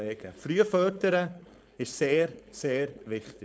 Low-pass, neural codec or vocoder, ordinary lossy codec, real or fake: none; codec, 16 kHz, 4.8 kbps, FACodec; none; fake